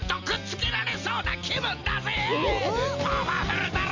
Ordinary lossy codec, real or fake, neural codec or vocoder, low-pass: MP3, 48 kbps; real; none; 7.2 kHz